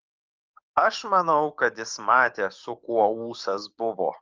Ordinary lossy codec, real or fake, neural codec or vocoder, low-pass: Opus, 24 kbps; fake; codec, 44.1 kHz, 7.8 kbps, DAC; 7.2 kHz